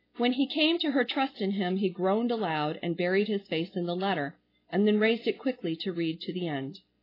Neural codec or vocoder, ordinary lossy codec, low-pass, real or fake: none; AAC, 24 kbps; 5.4 kHz; real